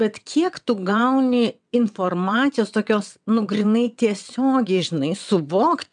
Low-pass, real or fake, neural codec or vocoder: 9.9 kHz; fake; vocoder, 22.05 kHz, 80 mel bands, WaveNeXt